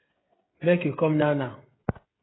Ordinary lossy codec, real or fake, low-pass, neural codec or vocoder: AAC, 16 kbps; fake; 7.2 kHz; codec, 16 kHz, 16 kbps, FreqCodec, smaller model